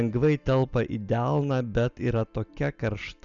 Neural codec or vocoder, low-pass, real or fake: none; 7.2 kHz; real